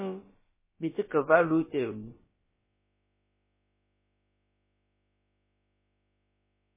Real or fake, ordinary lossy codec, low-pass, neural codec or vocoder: fake; MP3, 16 kbps; 3.6 kHz; codec, 16 kHz, about 1 kbps, DyCAST, with the encoder's durations